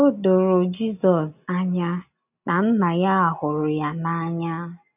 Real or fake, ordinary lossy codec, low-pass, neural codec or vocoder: real; none; 3.6 kHz; none